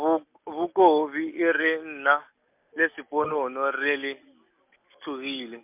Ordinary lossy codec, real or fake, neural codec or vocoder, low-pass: MP3, 32 kbps; real; none; 3.6 kHz